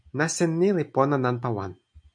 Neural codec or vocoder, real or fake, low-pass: none; real; 9.9 kHz